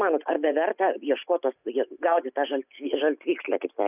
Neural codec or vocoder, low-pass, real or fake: none; 3.6 kHz; real